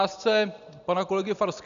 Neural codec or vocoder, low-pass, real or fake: none; 7.2 kHz; real